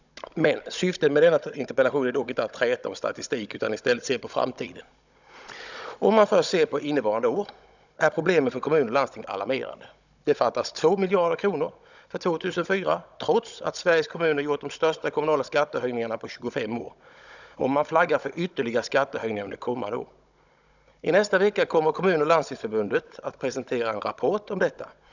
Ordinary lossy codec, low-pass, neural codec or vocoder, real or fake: none; 7.2 kHz; codec, 16 kHz, 16 kbps, FunCodec, trained on Chinese and English, 50 frames a second; fake